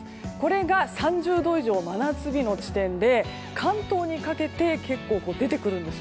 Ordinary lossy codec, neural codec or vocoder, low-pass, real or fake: none; none; none; real